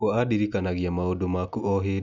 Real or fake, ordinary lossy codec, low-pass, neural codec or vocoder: real; none; 7.2 kHz; none